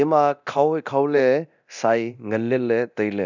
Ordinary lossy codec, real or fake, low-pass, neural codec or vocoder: none; fake; 7.2 kHz; codec, 24 kHz, 0.9 kbps, DualCodec